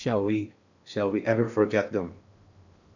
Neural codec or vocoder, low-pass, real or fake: codec, 16 kHz in and 24 kHz out, 0.8 kbps, FocalCodec, streaming, 65536 codes; 7.2 kHz; fake